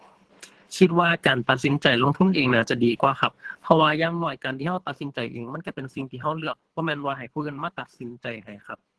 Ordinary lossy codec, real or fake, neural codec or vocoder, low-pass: Opus, 16 kbps; fake; codec, 24 kHz, 3 kbps, HILCodec; 10.8 kHz